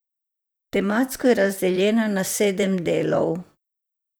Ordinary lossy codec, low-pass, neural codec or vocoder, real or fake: none; none; vocoder, 44.1 kHz, 128 mel bands, Pupu-Vocoder; fake